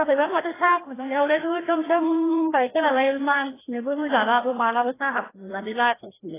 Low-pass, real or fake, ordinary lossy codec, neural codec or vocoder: 3.6 kHz; fake; AAC, 16 kbps; codec, 16 kHz, 1 kbps, FreqCodec, larger model